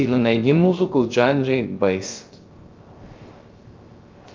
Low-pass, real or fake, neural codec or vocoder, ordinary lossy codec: 7.2 kHz; fake; codec, 16 kHz, 0.3 kbps, FocalCodec; Opus, 24 kbps